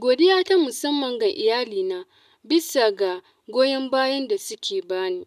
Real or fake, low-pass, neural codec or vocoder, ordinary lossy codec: real; 14.4 kHz; none; none